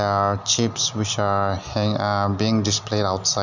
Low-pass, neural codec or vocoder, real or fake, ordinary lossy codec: 7.2 kHz; none; real; none